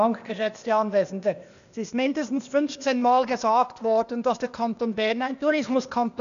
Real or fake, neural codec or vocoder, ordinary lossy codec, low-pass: fake; codec, 16 kHz, 0.8 kbps, ZipCodec; none; 7.2 kHz